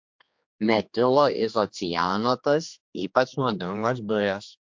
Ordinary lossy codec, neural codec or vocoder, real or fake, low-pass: MP3, 48 kbps; codec, 16 kHz, 2 kbps, X-Codec, HuBERT features, trained on general audio; fake; 7.2 kHz